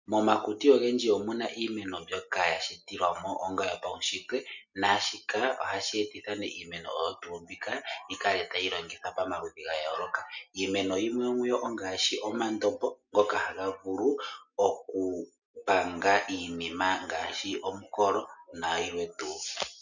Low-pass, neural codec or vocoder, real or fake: 7.2 kHz; none; real